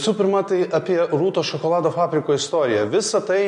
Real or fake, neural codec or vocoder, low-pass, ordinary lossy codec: real; none; 10.8 kHz; MP3, 48 kbps